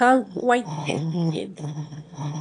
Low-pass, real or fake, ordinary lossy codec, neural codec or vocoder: 9.9 kHz; fake; AAC, 64 kbps; autoencoder, 22.05 kHz, a latent of 192 numbers a frame, VITS, trained on one speaker